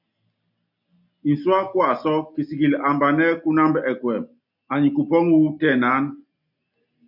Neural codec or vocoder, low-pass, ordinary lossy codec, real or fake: none; 5.4 kHz; MP3, 48 kbps; real